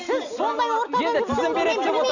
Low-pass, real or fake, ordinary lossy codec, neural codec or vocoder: 7.2 kHz; real; none; none